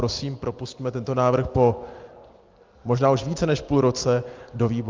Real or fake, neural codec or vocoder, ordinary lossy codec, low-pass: real; none; Opus, 24 kbps; 7.2 kHz